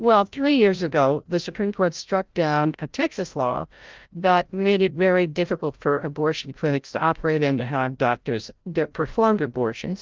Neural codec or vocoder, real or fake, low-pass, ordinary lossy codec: codec, 16 kHz, 0.5 kbps, FreqCodec, larger model; fake; 7.2 kHz; Opus, 32 kbps